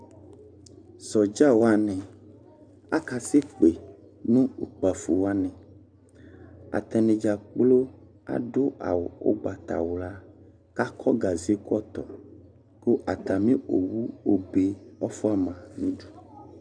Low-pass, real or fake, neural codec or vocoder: 9.9 kHz; real; none